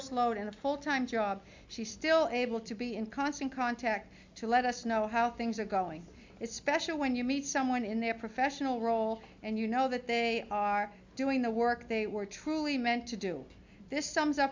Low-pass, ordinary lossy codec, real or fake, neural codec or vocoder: 7.2 kHz; MP3, 64 kbps; real; none